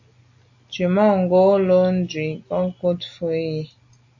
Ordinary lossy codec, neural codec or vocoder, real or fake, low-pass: AAC, 48 kbps; none; real; 7.2 kHz